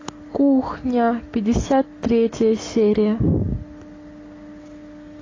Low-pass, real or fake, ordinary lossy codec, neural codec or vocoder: 7.2 kHz; real; AAC, 32 kbps; none